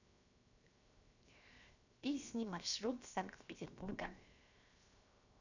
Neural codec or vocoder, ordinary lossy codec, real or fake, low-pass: codec, 16 kHz, 0.7 kbps, FocalCodec; none; fake; 7.2 kHz